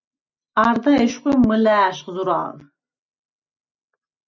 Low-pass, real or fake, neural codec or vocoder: 7.2 kHz; real; none